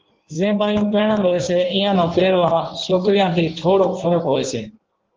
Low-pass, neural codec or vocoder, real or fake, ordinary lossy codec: 7.2 kHz; codec, 16 kHz in and 24 kHz out, 1.1 kbps, FireRedTTS-2 codec; fake; Opus, 16 kbps